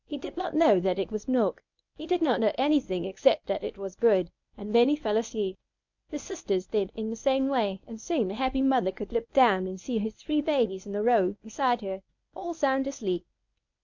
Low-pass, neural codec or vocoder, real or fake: 7.2 kHz; codec, 24 kHz, 0.9 kbps, WavTokenizer, medium speech release version 1; fake